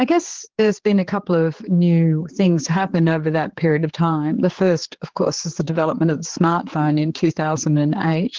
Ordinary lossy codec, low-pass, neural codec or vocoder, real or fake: Opus, 16 kbps; 7.2 kHz; codec, 16 kHz, 4 kbps, X-Codec, HuBERT features, trained on general audio; fake